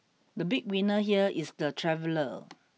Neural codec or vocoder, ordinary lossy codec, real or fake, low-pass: none; none; real; none